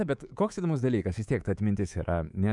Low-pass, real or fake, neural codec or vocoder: 10.8 kHz; fake; codec, 24 kHz, 3.1 kbps, DualCodec